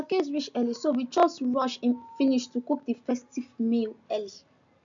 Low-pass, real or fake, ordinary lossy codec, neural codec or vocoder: 7.2 kHz; real; AAC, 64 kbps; none